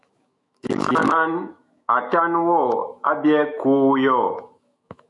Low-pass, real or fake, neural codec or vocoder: 10.8 kHz; fake; autoencoder, 48 kHz, 128 numbers a frame, DAC-VAE, trained on Japanese speech